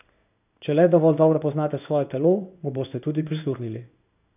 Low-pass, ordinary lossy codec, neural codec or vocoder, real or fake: 3.6 kHz; none; codec, 16 kHz in and 24 kHz out, 1 kbps, XY-Tokenizer; fake